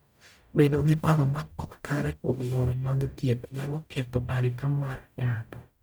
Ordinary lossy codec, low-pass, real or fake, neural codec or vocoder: none; none; fake; codec, 44.1 kHz, 0.9 kbps, DAC